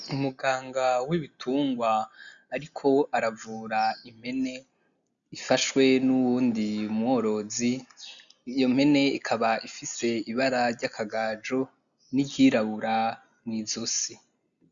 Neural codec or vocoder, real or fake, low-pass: none; real; 7.2 kHz